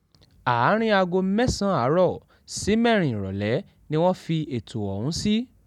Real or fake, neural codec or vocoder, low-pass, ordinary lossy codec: real; none; 19.8 kHz; none